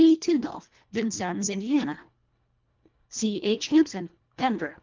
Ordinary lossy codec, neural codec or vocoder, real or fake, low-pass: Opus, 24 kbps; codec, 24 kHz, 1.5 kbps, HILCodec; fake; 7.2 kHz